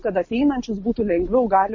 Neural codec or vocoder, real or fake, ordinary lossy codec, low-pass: none; real; MP3, 32 kbps; 7.2 kHz